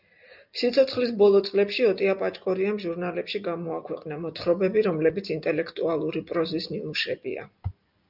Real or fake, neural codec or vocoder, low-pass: real; none; 5.4 kHz